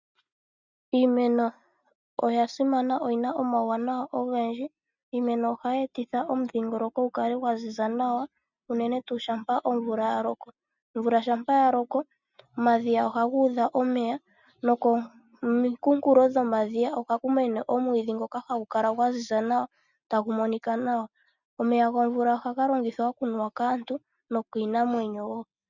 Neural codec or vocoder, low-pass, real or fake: none; 7.2 kHz; real